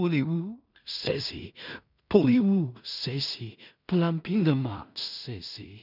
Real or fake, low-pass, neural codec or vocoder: fake; 5.4 kHz; codec, 16 kHz in and 24 kHz out, 0.4 kbps, LongCat-Audio-Codec, two codebook decoder